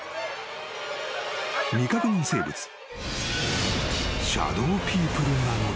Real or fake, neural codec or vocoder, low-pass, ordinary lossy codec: real; none; none; none